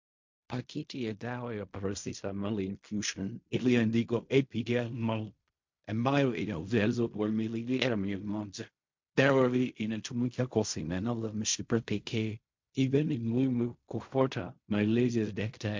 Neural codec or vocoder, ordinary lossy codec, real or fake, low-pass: codec, 16 kHz in and 24 kHz out, 0.4 kbps, LongCat-Audio-Codec, fine tuned four codebook decoder; MP3, 48 kbps; fake; 7.2 kHz